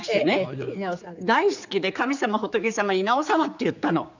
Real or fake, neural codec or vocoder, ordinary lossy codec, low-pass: fake; codec, 16 kHz, 4 kbps, X-Codec, HuBERT features, trained on general audio; none; 7.2 kHz